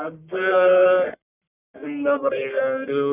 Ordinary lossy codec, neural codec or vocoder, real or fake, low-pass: none; codec, 44.1 kHz, 1.7 kbps, Pupu-Codec; fake; 3.6 kHz